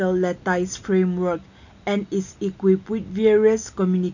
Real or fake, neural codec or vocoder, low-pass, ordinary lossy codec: real; none; 7.2 kHz; none